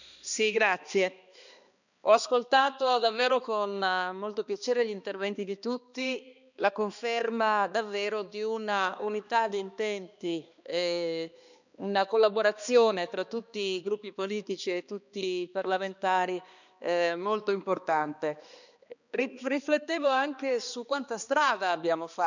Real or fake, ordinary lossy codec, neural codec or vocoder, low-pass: fake; none; codec, 16 kHz, 2 kbps, X-Codec, HuBERT features, trained on balanced general audio; 7.2 kHz